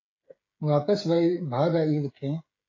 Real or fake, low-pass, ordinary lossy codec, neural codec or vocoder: fake; 7.2 kHz; AAC, 32 kbps; codec, 16 kHz, 16 kbps, FreqCodec, smaller model